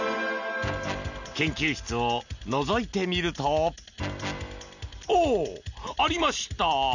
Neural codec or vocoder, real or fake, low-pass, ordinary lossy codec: none; real; 7.2 kHz; none